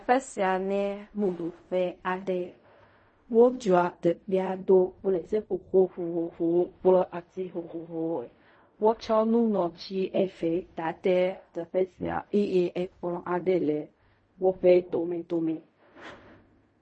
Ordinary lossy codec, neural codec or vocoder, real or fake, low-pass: MP3, 32 kbps; codec, 16 kHz in and 24 kHz out, 0.4 kbps, LongCat-Audio-Codec, fine tuned four codebook decoder; fake; 9.9 kHz